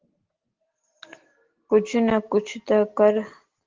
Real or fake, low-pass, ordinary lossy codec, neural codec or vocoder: real; 7.2 kHz; Opus, 16 kbps; none